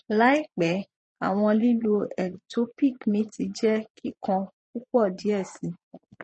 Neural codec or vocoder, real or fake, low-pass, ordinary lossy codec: vocoder, 22.05 kHz, 80 mel bands, Vocos; fake; 9.9 kHz; MP3, 32 kbps